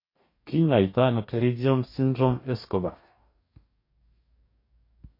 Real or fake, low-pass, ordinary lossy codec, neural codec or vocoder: fake; 5.4 kHz; MP3, 32 kbps; codec, 44.1 kHz, 2.6 kbps, DAC